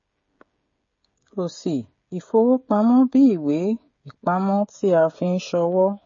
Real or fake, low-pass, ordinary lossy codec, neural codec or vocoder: fake; 7.2 kHz; MP3, 32 kbps; codec, 16 kHz, 16 kbps, FreqCodec, smaller model